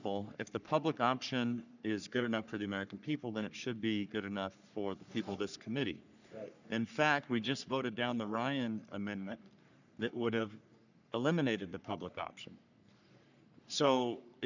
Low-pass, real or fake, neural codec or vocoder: 7.2 kHz; fake; codec, 44.1 kHz, 3.4 kbps, Pupu-Codec